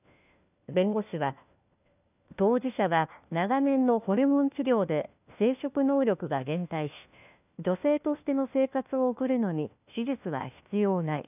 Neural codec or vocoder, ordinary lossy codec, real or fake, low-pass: codec, 16 kHz, 1 kbps, FunCodec, trained on LibriTTS, 50 frames a second; none; fake; 3.6 kHz